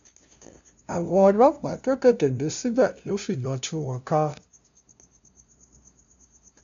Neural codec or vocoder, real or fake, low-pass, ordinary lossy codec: codec, 16 kHz, 0.5 kbps, FunCodec, trained on LibriTTS, 25 frames a second; fake; 7.2 kHz; MP3, 64 kbps